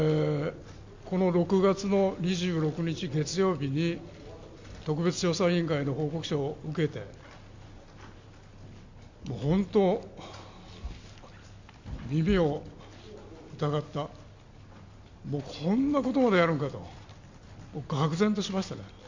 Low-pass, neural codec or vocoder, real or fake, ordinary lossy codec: 7.2 kHz; none; real; MP3, 64 kbps